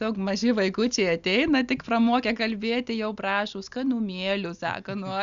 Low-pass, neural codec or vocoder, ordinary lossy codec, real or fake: 7.2 kHz; none; Opus, 64 kbps; real